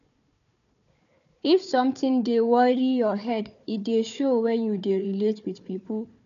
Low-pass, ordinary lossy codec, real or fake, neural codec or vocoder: 7.2 kHz; none; fake; codec, 16 kHz, 4 kbps, FunCodec, trained on Chinese and English, 50 frames a second